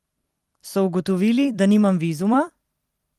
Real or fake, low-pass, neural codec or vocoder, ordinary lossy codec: fake; 14.4 kHz; vocoder, 44.1 kHz, 128 mel bands every 256 samples, BigVGAN v2; Opus, 24 kbps